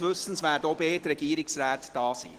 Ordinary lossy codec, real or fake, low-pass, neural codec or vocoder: Opus, 16 kbps; real; 14.4 kHz; none